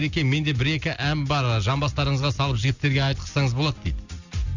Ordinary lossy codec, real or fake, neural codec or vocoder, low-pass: none; real; none; 7.2 kHz